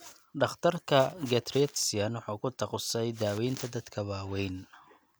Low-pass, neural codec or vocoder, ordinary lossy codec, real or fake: none; none; none; real